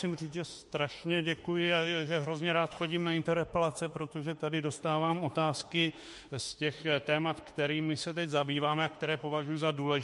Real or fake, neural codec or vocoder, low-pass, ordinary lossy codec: fake; autoencoder, 48 kHz, 32 numbers a frame, DAC-VAE, trained on Japanese speech; 14.4 kHz; MP3, 48 kbps